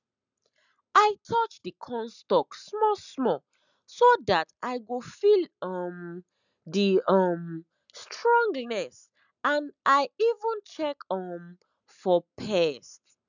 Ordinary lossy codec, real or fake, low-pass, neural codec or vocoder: none; real; 7.2 kHz; none